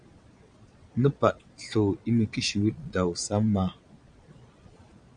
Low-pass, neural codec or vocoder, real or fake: 9.9 kHz; vocoder, 22.05 kHz, 80 mel bands, Vocos; fake